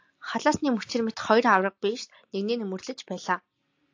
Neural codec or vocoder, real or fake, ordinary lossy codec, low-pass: none; real; AAC, 48 kbps; 7.2 kHz